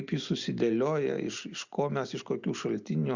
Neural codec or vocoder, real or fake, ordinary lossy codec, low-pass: none; real; Opus, 64 kbps; 7.2 kHz